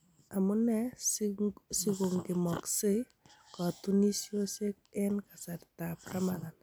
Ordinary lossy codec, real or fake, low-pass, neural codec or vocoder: none; real; none; none